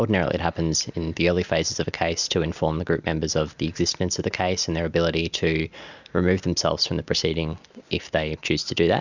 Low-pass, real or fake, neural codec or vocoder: 7.2 kHz; real; none